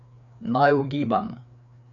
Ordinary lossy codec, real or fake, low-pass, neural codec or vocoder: none; fake; 7.2 kHz; codec, 16 kHz, 4 kbps, FreqCodec, larger model